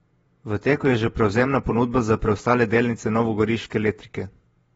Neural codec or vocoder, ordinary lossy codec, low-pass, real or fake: vocoder, 48 kHz, 128 mel bands, Vocos; AAC, 24 kbps; 19.8 kHz; fake